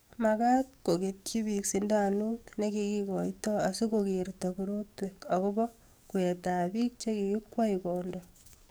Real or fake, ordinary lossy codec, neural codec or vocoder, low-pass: fake; none; codec, 44.1 kHz, 7.8 kbps, DAC; none